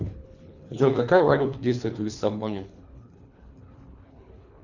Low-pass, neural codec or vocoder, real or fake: 7.2 kHz; codec, 24 kHz, 3 kbps, HILCodec; fake